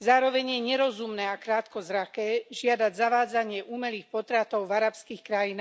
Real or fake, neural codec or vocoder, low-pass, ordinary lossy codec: real; none; none; none